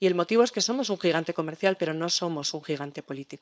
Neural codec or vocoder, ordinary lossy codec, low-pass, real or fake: codec, 16 kHz, 4.8 kbps, FACodec; none; none; fake